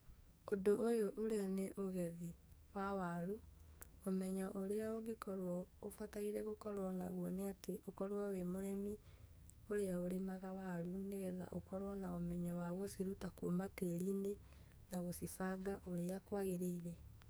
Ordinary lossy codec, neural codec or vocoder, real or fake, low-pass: none; codec, 44.1 kHz, 2.6 kbps, SNAC; fake; none